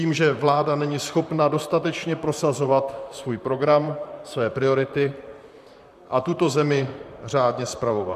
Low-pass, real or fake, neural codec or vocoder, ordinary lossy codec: 14.4 kHz; fake; vocoder, 44.1 kHz, 128 mel bands, Pupu-Vocoder; MP3, 96 kbps